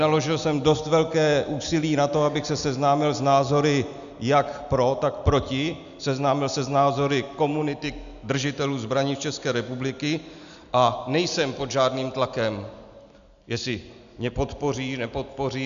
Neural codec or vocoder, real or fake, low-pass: none; real; 7.2 kHz